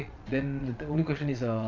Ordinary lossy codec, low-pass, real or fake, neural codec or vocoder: none; 7.2 kHz; fake; vocoder, 22.05 kHz, 80 mel bands, Vocos